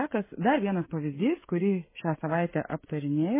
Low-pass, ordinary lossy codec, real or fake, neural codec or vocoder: 3.6 kHz; MP3, 16 kbps; fake; codec, 16 kHz, 16 kbps, FreqCodec, smaller model